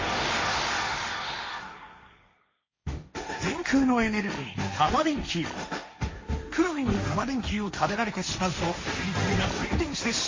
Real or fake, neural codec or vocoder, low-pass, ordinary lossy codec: fake; codec, 16 kHz, 1.1 kbps, Voila-Tokenizer; 7.2 kHz; MP3, 32 kbps